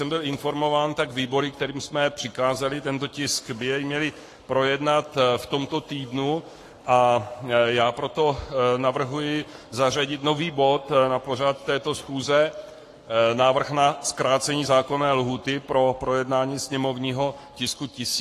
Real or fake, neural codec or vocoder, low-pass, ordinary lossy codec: fake; codec, 44.1 kHz, 7.8 kbps, Pupu-Codec; 14.4 kHz; AAC, 48 kbps